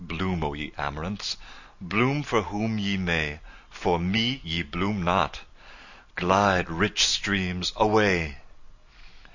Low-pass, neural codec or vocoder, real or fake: 7.2 kHz; none; real